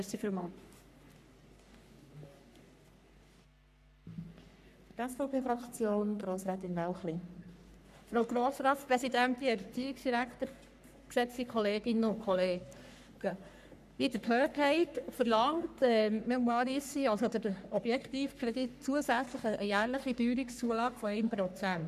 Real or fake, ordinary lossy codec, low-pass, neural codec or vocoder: fake; none; 14.4 kHz; codec, 44.1 kHz, 3.4 kbps, Pupu-Codec